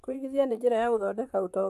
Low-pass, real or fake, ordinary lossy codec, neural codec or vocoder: 14.4 kHz; fake; AAC, 96 kbps; vocoder, 44.1 kHz, 128 mel bands, Pupu-Vocoder